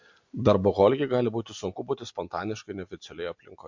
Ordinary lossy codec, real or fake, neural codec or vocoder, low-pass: MP3, 48 kbps; real; none; 7.2 kHz